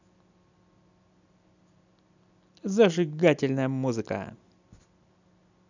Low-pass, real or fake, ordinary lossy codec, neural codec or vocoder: 7.2 kHz; real; none; none